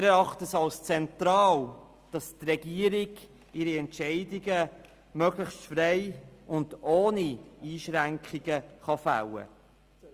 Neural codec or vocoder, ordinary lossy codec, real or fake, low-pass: none; Opus, 32 kbps; real; 14.4 kHz